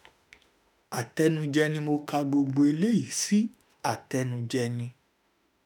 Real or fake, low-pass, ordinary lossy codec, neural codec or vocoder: fake; none; none; autoencoder, 48 kHz, 32 numbers a frame, DAC-VAE, trained on Japanese speech